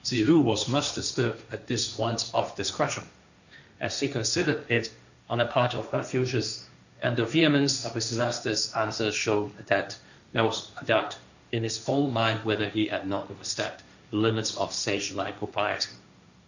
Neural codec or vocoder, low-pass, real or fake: codec, 16 kHz, 1.1 kbps, Voila-Tokenizer; 7.2 kHz; fake